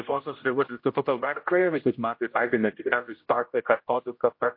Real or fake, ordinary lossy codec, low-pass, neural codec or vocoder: fake; MP3, 32 kbps; 5.4 kHz; codec, 16 kHz, 0.5 kbps, X-Codec, HuBERT features, trained on general audio